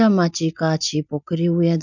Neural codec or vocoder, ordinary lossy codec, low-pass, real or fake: none; none; 7.2 kHz; real